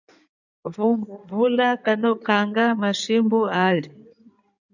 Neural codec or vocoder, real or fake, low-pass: codec, 16 kHz in and 24 kHz out, 2.2 kbps, FireRedTTS-2 codec; fake; 7.2 kHz